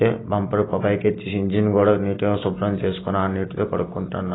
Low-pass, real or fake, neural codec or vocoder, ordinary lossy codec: 7.2 kHz; real; none; AAC, 16 kbps